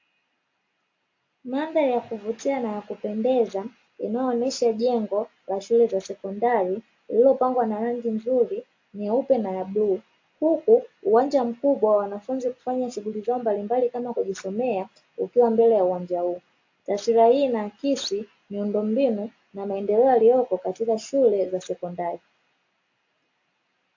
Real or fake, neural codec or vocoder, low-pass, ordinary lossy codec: real; none; 7.2 kHz; AAC, 48 kbps